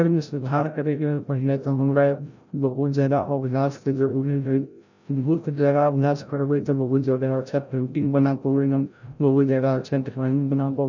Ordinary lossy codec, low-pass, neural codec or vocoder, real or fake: none; 7.2 kHz; codec, 16 kHz, 0.5 kbps, FreqCodec, larger model; fake